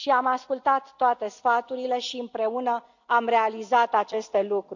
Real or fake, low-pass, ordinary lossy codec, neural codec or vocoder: real; 7.2 kHz; none; none